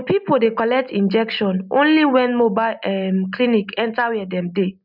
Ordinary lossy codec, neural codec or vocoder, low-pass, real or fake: none; none; 5.4 kHz; real